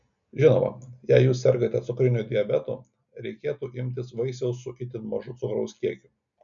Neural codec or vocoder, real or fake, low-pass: none; real; 7.2 kHz